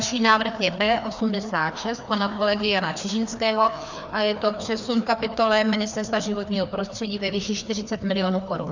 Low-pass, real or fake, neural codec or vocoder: 7.2 kHz; fake; codec, 16 kHz, 2 kbps, FreqCodec, larger model